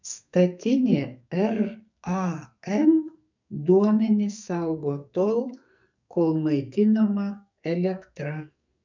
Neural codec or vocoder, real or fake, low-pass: codec, 44.1 kHz, 2.6 kbps, SNAC; fake; 7.2 kHz